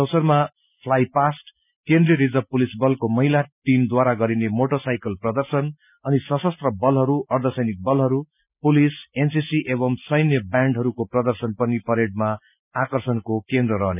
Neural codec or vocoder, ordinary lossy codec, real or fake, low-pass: none; none; real; 3.6 kHz